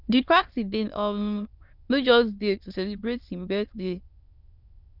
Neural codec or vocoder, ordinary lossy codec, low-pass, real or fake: autoencoder, 22.05 kHz, a latent of 192 numbers a frame, VITS, trained on many speakers; Opus, 64 kbps; 5.4 kHz; fake